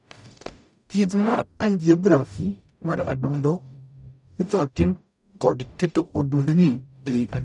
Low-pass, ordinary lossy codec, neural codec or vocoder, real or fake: 10.8 kHz; none; codec, 44.1 kHz, 0.9 kbps, DAC; fake